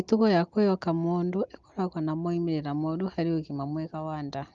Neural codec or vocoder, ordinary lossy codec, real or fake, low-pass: none; Opus, 32 kbps; real; 7.2 kHz